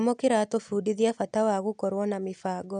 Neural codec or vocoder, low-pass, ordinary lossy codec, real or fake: none; 9.9 kHz; none; real